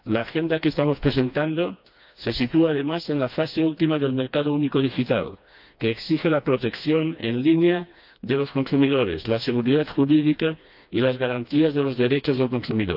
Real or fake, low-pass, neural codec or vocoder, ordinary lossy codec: fake; 5.4 kHz; codec, 16 kHz, 2 kbps, FreqCodec, smaller model; AAC, 48 kbps